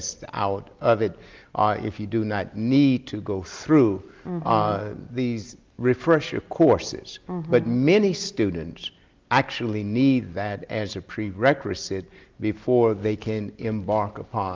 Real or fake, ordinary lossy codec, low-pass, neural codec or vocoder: real; Opus, 32 kbps; 7.2 kHz; none